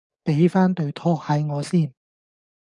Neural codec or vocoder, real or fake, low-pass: codec, 44.1 kHz, 7.8 kbps, DAC; fake; 10.8 kHz